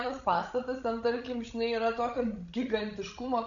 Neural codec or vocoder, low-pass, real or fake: codec, 16 kHz, 16 kbps, FreqCodec, larger model; 7.2 kHz; fake